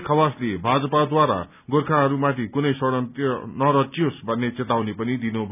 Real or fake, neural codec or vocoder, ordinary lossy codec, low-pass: real; none; none; 3.6 kHz